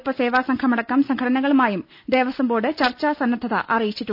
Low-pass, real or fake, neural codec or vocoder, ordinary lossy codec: 5.4 kHz; real; none; none